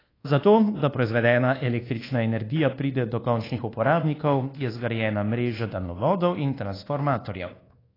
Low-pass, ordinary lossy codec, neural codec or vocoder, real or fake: 5.4 kHz; AAC, 24 kbps; codec, 24 kHz, 1.2 kbps, DualCodec; fake